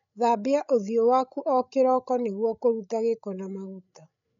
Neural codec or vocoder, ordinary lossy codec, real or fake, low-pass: codec, 16 kHz, 16 kbps, FreqCodec, larger model; none; fake; 7.2 kHz